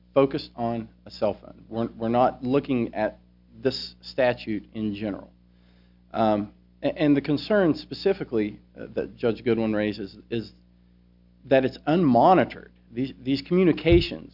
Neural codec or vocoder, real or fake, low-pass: none; real; 5.4 kHz